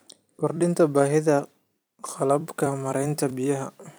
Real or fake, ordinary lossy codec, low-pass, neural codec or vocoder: fake; none; none; vocoder, 44.1 kHz, 128 mel bands every 256 samples, BigVGAN v2